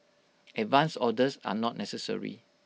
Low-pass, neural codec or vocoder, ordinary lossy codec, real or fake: none; none; none; real